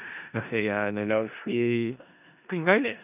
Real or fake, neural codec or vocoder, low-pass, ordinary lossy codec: fake; codec, 16 kHz in and 24 kHz out, 0.4 kbps, LongCat-Audio-Codec, four codebook decoder; 3.6 kHz; none